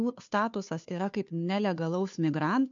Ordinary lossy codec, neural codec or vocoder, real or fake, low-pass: MP3, 64 kbps; codec, 16 kHz, 2 kbps, FunCodec, trained on Chinese and English, 25 frames a second; fake; 7.2 kHz